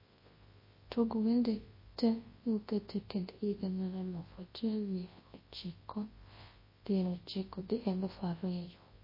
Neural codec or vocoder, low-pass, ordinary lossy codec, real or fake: codec, 24 kHz, 0.9 kbps, WavTokenizer, large speech release; 5.4 kHz; MP3, 24 kbps; fake